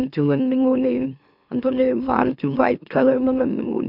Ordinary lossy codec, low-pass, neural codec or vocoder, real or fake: none; 5.4 kHz; autoencoder, 44.1 kHz, a latent of 192 numbers a frame, MeloTTS; fake